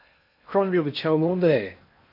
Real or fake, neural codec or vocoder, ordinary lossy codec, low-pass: fake; codec, 16 kHz in and 24 kHz out, 0.6 kbps, FocalCodec, streaming, 4096 codes; AAC, 48 kbps; 5.4 kHz